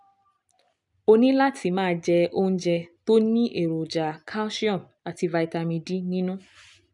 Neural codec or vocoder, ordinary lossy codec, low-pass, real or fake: none; none; 10.8 kHz; real